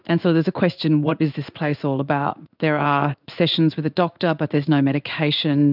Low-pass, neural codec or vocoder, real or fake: 5.4 kHz; codec, 16 kHz in and 24 kHz out, 1 kbps, XY-Tokenizer; fake